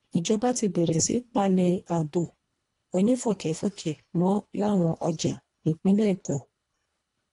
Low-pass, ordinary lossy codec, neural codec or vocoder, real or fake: 10.8 kHz; AAC, 48 kbps; codec, 24 kHz, 1.5 kbps, HILCodec; fake